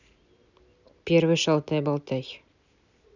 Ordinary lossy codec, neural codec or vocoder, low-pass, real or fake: none; none; 7.2 kHz; real